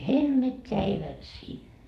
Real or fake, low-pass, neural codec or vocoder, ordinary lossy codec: fake; 14.4 kHz; codec, 44.1 kHz, 2.6 kbps, SNAC; none